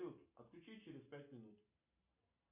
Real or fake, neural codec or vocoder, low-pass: real; none; 3.6 kHz